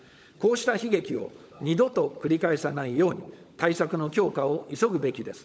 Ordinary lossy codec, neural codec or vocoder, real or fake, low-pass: none; codec, 16 kHz, 16 kbps, FunCodec, trained on LibriTTS, 50 frames a second; fake; none